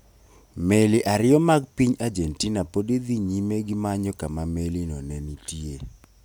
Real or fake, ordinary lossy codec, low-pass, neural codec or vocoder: real; none; none; none